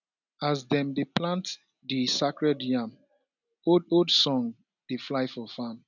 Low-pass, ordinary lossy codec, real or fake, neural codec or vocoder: 7.2 kHz; none; real; none